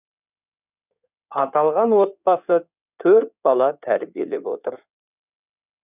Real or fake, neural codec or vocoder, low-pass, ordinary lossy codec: fake; codec, 16 kHz in and 24 kHz out, 2.2 kbps, FireRedTTS-2 codec; 3.6 kHz; none